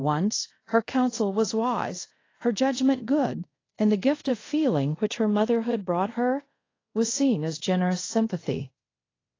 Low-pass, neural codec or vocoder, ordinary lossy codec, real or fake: 7.2 kHz; codec, 24 kHz, 0.5 kbps, DualCodec; AAC, 32 kbps; fake